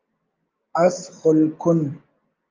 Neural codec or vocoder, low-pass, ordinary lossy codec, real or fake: none; 7.2 kHz; Opus, 24 kbps; real